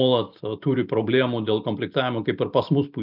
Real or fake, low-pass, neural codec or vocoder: real; 5.4 kHz; none